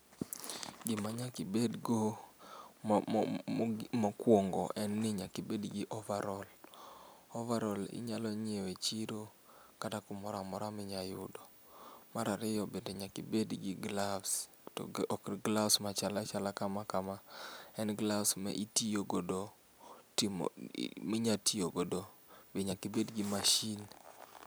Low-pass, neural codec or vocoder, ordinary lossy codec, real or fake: none; none; none; real